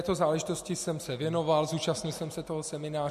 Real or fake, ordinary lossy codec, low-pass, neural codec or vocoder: real; MP3, 64 kbps; 14.4 kHz; none